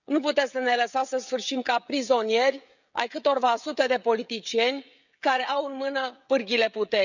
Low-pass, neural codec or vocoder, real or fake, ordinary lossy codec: 7.2 kHz; codec, 16 kHz, 16 kbps, FreqCodec, smaller model; fake; none